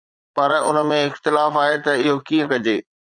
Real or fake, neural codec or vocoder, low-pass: fake; vocoder, 22.05 kHz, 80 mel bands, Vocos; 9.9 kHz